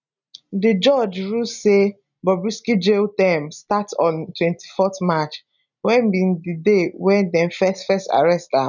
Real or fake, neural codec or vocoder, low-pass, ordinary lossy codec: real; none; 7.2 kHz; none